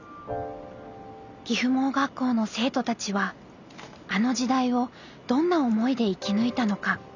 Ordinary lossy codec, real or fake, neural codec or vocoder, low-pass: none; real; none; 7.2 kHz